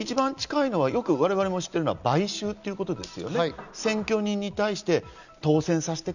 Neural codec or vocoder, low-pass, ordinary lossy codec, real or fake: none; 7.2 kHz; none; real